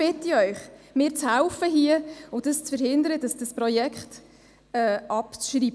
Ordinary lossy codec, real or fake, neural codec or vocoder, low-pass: none; real; none; none